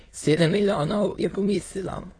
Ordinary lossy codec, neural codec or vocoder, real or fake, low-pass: AAC, 32 kbps; autoencoder, 22.05 kHz, a latent of 192 numbers a frame, VITS, trained on many speakers; fake; 9.9 kHz